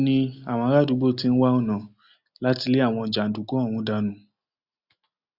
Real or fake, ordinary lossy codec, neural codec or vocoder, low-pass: real; Opus, 64 kbps; none; 5.4 kHz